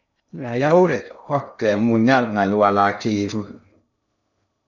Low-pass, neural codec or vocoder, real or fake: 7.2 kHz; codec, 16 kHz in and 24 kHz out, 0.6 kbps, FocalCodec, streaming, 2048 codes; fake